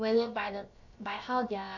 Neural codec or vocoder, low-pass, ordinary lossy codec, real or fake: codec, 16 kHz, about 1 kbps, DyCAST, with the encoder's durations; 7.2 kHz; MP3, 48 kbps; fake